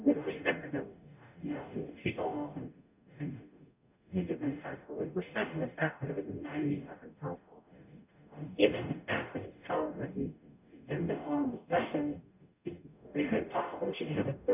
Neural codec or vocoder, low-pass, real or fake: codec, 44.1 kHz, 0.9 kbps, DAC; 3.6 kHz; fake